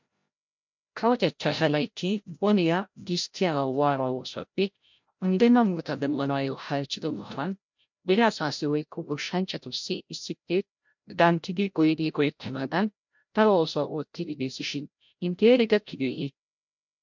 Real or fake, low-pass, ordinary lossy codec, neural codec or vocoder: fake; 7.2 kHz; MP3, 64 kbps; codec, 16 kHz, 0.5 kbps, FreqCodec, larger model